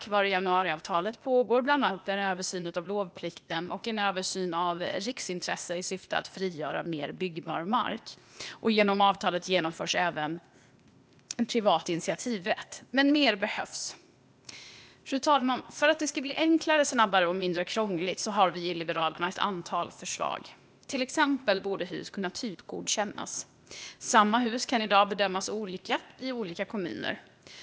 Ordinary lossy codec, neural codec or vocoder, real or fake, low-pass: none; codec, 16 kHz, 0.8 kbps, ZipCodec; fake; none